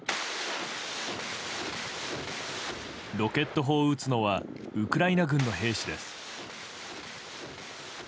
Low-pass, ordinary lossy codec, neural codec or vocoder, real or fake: none; none; none; real